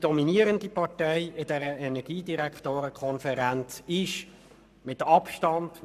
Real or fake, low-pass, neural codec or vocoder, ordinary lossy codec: fake; 14.4 kHz; codec, 44.1 kHz, 7.8 kbps, Pupu-Codec; none